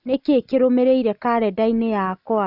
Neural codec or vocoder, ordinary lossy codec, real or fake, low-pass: none; none; real; 5.4 kHz